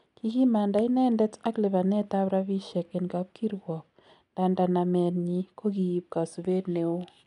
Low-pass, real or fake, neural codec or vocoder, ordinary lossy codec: 10.8 kHz; real; none; none